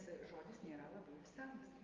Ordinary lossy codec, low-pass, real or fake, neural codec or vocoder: Opus, 32 kbps; 7.2 kHz; real; none